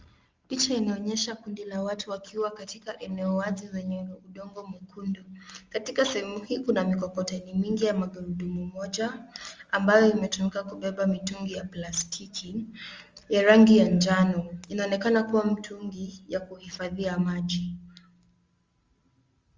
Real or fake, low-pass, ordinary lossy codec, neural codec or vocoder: real; 7.2 kHz; Opus, 32 kbps; none